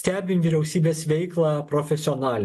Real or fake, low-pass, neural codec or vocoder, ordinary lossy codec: real; 14.4 kHz; none; MP3, 64 kbps